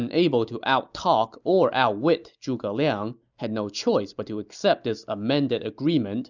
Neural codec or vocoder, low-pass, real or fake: none; 7.2 kHz; real